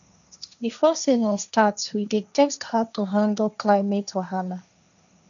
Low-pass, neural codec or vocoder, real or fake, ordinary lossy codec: 7.2 kHz; codec, 16 kHz, 1.1 kbps, Voila-Tokenizer; fake; none